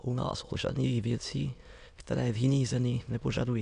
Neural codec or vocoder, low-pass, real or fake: autoencoder, 22.05 kHz, a latent of 192 numbers a frame, VITS, trained on many speakers; 9.9 kHz; fake